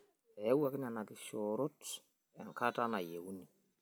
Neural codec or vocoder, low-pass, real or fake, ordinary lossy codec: none; none; real; none